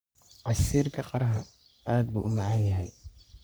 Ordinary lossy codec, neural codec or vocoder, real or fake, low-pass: none; codec, 44.1 kHz, 3.4 kbps, Pupu-Codec; fake; none